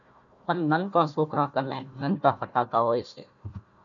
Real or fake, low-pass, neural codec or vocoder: fake; 7.2 kHz; codec, 16 kHz, 1 kbps, FunCodec, trained on Chinese and English, 50 frames a second